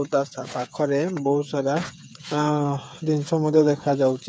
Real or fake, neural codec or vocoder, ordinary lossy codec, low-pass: fake; codec, 16 kHz, 8 kbps, FreqCodec, smaller model; none; none